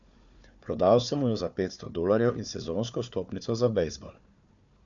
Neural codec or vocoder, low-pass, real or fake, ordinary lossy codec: codec, 16 kHz, 4 kbps, FunCodec, trained on Chinese and English, 50 frames a second; 7.2 kHz; fake; MP3, 96 kbps